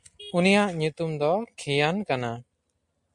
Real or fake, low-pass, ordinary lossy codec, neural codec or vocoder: real; 10.8 kHz; MP3, 64 kbps; none